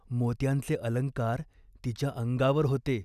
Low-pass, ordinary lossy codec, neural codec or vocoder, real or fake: 14.4 kHz; none; none; real